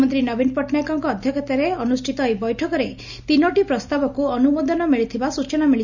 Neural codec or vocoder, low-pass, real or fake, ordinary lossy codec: none; 7.2 kHz; real; none